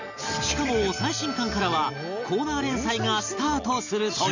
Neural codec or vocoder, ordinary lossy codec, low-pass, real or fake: none; none; 7.2 kHz; real